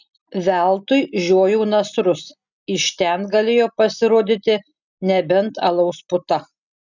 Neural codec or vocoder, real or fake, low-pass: none; real; 7.2 kHz